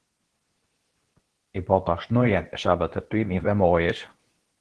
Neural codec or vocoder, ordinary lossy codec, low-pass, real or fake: codec, 24 kHz, 0.9 kbps, WavTokenizer, medium speech release version 2; Opus, 16 kbps; 10.8 kHz; fake